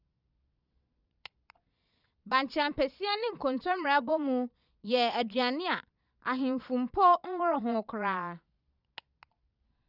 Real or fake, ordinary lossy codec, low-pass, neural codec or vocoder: fake; none; 5.4 kHz; vocoder, 22.05 kHz, 80 mel bands, Vocos